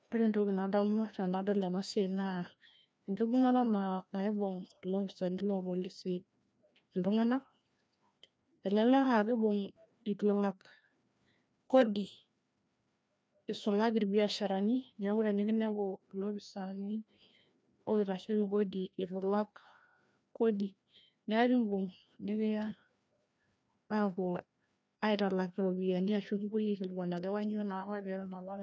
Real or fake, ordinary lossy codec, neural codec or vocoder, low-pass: fake; none; codec, 16 kHz, 1 kbps, FreqCodec, larger model; none